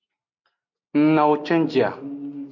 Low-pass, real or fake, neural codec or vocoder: 7.2 kHz; real; none